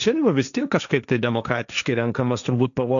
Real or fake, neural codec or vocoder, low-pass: fake; codec, 16 kHz, 1.1 kbps, Voila-Tokenizer; 7.2 kHz